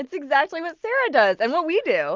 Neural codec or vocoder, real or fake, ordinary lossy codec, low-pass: none; real; Opus, 24 kbps; 7.2 kHz